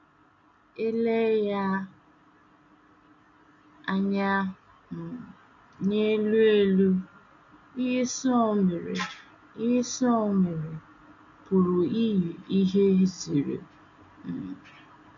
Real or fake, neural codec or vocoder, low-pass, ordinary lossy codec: real; none; 7.2 kHz; AAC, 64 kbps